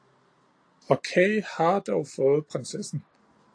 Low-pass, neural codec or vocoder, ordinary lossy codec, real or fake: 9.9 kHz; none; AAC, 48 kbps; real